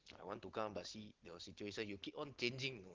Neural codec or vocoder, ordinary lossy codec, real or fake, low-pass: none; Opus, 16 kbps; real; 7.2 kHz